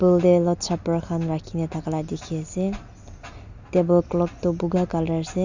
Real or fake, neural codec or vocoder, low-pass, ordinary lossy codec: real; none; 7.2 kHz; Opus, 64 kbps